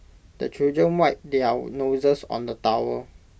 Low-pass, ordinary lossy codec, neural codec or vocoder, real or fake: none; none; none; real